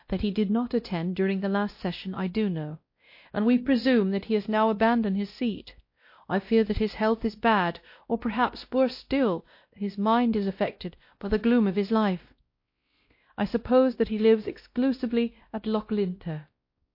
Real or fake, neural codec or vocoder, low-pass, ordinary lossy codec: fake; codec, 16 kHz, 1 kbps, X-Codec, WavLM features, trained on Multilingual LibriSpeech; 5.4 kHz; MP3, 32 kbps